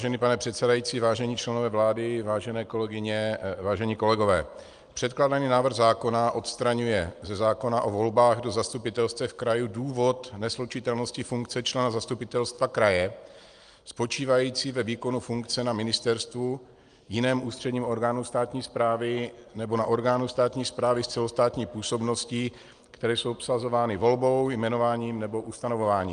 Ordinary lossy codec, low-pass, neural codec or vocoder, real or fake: Opus, 32 kbps; 9.9 kHz; none; real